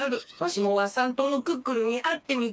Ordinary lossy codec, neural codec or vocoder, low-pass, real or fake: none; codec, 16 kHz, 2 kbps, FreqCodec, smaller model; none; fake